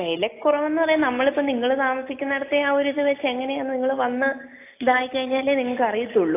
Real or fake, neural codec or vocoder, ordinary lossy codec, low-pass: real; none; AAC, 24 kbps; 3.6 kHz